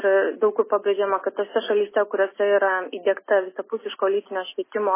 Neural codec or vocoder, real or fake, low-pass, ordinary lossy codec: none; real; 3.6 kHz; MP3, 16 kbps